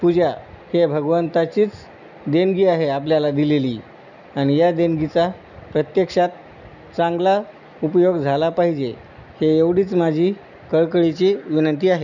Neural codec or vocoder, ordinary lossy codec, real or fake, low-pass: none; none; real; 7.2 kHz